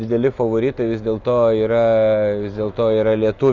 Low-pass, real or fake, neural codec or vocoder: 7.2 kHz; real; none